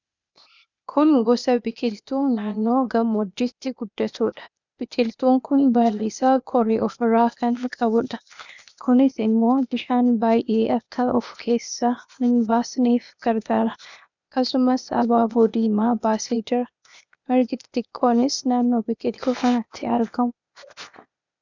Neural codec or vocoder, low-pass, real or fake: codec, 16 kHz, 0.8 kbps, ZipCodec; 7.2 kHz; fake